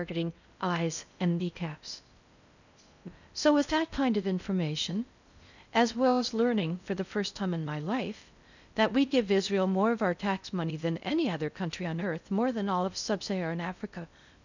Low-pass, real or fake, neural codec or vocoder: 7.2 kHz; fake; codec, 16 kHz in and 24 kHz out, 0.6 kbps, FocalCodec, streaming, 4096 codes